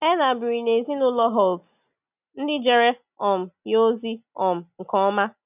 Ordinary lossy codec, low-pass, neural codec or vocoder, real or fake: none; 3.6 kHz; none; real